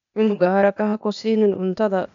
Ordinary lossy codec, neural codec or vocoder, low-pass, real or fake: none; codec, 16 kHz, 0.8 kbps, ZipCodec; 7.2 kHz; fake